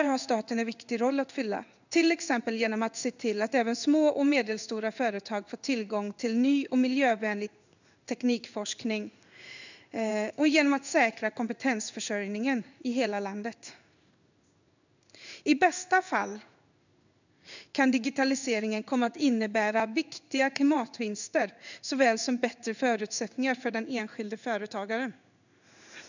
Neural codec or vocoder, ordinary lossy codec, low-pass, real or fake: codec, 16 kHz in and 24 kHz out, 1 kbps, XY-Tokenizer; none; 7.2 kHz; fake